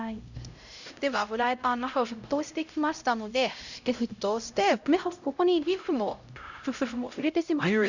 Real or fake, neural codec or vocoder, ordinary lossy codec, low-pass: fake; codec, 16 kHz, 0.5 kbps, X-Codec, HuBERT features, trained on LibriSpeech; none; 7.2 kHz